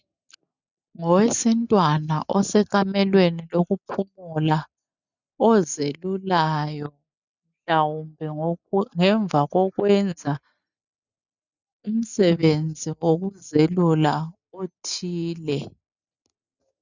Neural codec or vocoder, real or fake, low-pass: none; real; 7.2 kHz